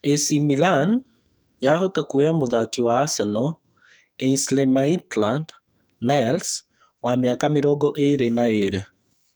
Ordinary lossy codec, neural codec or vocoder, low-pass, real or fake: none; codec, 44.1 kHz, 2.6 kbps, SNAC; none; fake